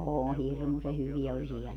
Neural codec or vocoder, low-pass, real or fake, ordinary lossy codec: none; 19.8 kHz; real; none